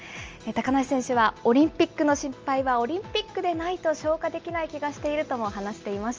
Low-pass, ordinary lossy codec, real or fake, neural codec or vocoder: 7.2 kHz; Opus, 24 kbps; real; none